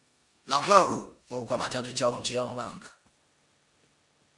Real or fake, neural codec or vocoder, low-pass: fake; codec, 16 kHz in and 24 kHz out, 0.9 kbps, LongCat-Audio-Codec, four codebook decoder; 10.8 kHz